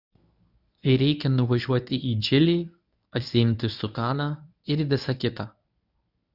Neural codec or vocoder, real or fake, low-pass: codec, 24 kHz, 0.9 kbps, WavTokenizer, medium speech release version 1; fake; 5.4 kHz